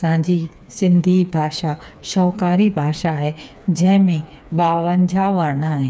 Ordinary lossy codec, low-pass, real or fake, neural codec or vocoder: none; none; fake; codec, 16 kHz, 4 kbps, FreqCodec, smaller model